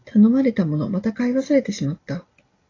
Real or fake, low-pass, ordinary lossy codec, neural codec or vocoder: real; 7.2 kHz; AAC, 32 kbps; none